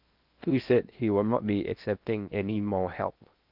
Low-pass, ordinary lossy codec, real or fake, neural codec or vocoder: 5.4 kHz; Opus, 32 kbps; fake; codec, 16 kHz in and 24 kHz out, 0.8 kbps, FocalCodec, streaming, 65536 codes